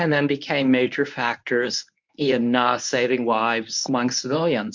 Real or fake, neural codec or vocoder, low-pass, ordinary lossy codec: fake; codec, 24 kHz, 0.9 kbps, WavTokenizer, medium speech release version 1; 7.2 kHz; MP3, 64 kbps